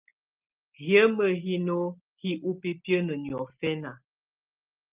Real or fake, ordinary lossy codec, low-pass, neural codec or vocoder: real; Opus, 24 kbps; 3.6 kHz; none